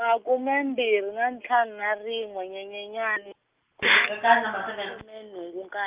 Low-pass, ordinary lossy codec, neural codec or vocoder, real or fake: 3.6 kHz; Opus, 32 kbps; none; real